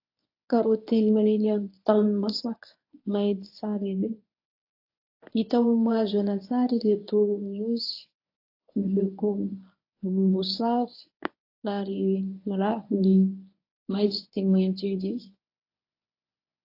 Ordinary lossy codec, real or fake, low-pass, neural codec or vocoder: AAC, 32 kbps; fake; 5.4 kHz; codec, 24 kHz, 0.9 kbps, WavTokenizer, medium speech release version 1